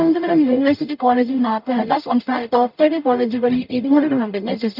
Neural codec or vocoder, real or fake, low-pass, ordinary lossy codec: codec, 44.1 kHz, 0.9 kbps, DAC; fake; 5.4 kHz; none